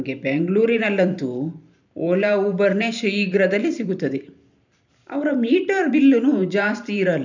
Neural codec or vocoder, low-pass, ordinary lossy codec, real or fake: vocoder, 44.1 kHz, 128 mel bands every 512 samples, BigVGAN v2; 7.2 kHz; none; fake